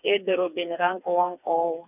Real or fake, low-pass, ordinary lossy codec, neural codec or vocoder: fake; 3.6 kHz; none; codec, 16 kHz, 4 kbps, FreqCodec, smaller model